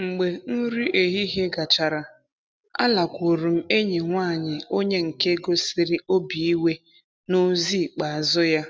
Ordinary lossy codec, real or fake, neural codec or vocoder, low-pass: none; real; none; none